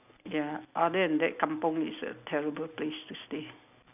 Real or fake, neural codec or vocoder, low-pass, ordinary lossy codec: real; none; 3.6 kHz; none